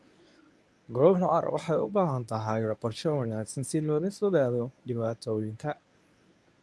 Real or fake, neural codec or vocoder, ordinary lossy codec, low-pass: fake; codec, 24 kHz, 0.9 kbps, WavTokenizer, medium speech release version 1; none; none